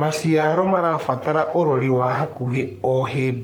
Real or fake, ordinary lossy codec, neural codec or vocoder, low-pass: fake; none; codec, 44.1 kHz, 3.4 kbps, Pupu-Codec; none